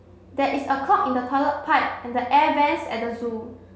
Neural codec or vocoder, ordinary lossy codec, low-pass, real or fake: none; none; none; real